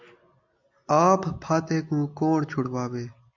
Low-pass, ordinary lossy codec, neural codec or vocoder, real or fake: 7.2 kHz; MP3, 64 kbps; none; real